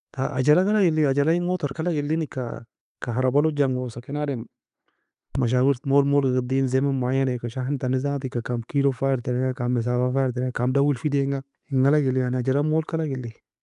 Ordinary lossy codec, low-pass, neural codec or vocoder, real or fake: none; 10.8 kHz; none; real